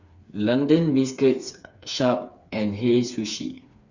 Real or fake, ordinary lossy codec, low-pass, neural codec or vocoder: fake; Opus, 64 kbps; 7.2 kHz; codec, 16 kHz, 4 kbps, FreqCodec, smaller model